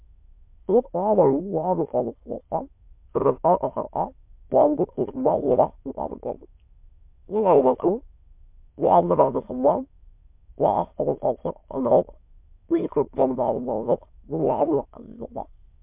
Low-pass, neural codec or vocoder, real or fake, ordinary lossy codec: 3.6 kHz; autoencoder, 22.05 kHz, a latent of 192 numbers a frame, VITS, trained on many speakers; fake; MP3, 32 kbps